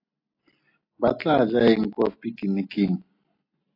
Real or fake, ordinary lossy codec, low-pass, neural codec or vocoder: real; AAC, 32 kbps; 5.4 kHz; none